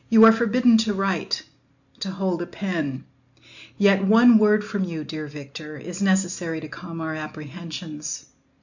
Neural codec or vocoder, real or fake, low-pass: none; real; 7.2 kHz